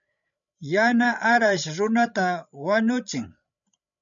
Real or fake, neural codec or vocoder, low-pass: fake; codec, 16 kHz, 16 kbps, FreqCodec, larger model; 7.2 kHz